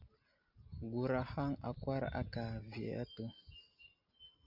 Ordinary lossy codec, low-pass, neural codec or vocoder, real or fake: MP3, 48 kbps; 5.4 kHz; none; real